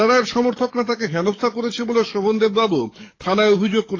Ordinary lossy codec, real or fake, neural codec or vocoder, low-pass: AAC, 32 kbps; fake; codec, 44.1 kHz, 7.8 kbps, DAC; 7.2 kHz